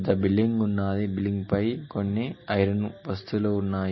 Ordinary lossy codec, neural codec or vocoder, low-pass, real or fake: MP3, 24 kbps; none; 7.2 kHz; real